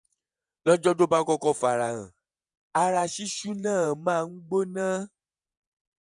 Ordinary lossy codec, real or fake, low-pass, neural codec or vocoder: none; fake; 10.8 kHz; vocoder, 48 kHz, 128 mel bands, Vocos